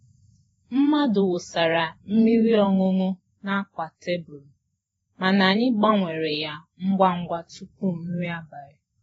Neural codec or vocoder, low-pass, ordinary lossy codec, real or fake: vocoder, 48 kHz, 128 mel bands, Vocos; 19.8 kHz; AAC, 24 kbps; fake